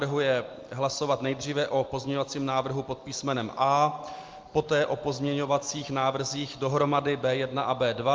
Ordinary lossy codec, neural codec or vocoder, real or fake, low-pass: Opus, 32 kbps; none; real; 7.2 kHz